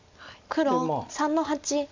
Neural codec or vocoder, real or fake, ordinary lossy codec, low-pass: none; real; MP3, 64 kbps; 7.2 kHz